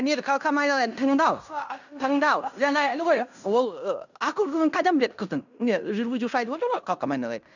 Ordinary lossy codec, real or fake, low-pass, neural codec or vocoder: none; fake; 7.2 kHz; codec, 16 kHz in and 24 kHz out, 0.9 kbps, LongCat-Audio-Codec, fine tuned four codebook decoder